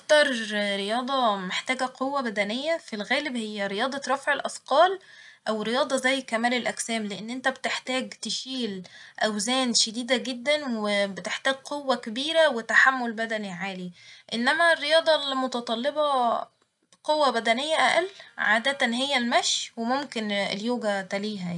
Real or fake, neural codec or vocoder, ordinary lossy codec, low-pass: real; none; none; 10.8 kHz